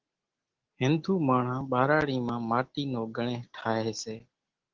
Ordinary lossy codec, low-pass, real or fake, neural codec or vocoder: Opus, 16 kbps; 7.2 kHz; real; none